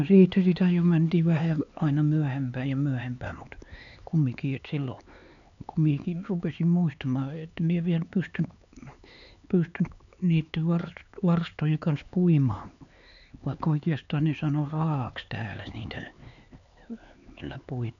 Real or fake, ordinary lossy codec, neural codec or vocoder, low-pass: fake; none; codec, 16 kHz, 4 kbps, X-Codec, HuBERT features, trained on LibriSpeech; 7.2 kHz